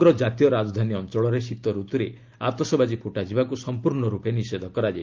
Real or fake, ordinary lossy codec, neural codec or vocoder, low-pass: real; Opus, 32 kbps; none; 7.2 kHz